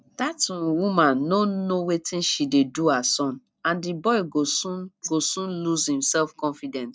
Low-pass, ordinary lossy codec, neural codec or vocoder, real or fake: none; none; none; real